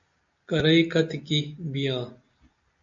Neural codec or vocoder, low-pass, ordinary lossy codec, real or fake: none; 7.2 kHz; MP3, 48 kbps; real